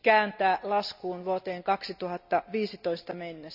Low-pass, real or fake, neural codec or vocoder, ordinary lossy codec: 5.4 kHz; real; none; none